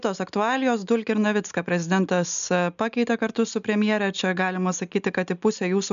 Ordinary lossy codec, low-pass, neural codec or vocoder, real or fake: MP3, 96 kbps; 7.2 kHz; none; real